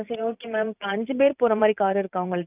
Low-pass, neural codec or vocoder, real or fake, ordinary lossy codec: 3.6 kHz; vocoder, 44.1 kHz, 128 mel bands every 512 samples, BigVGAN v2; fake; none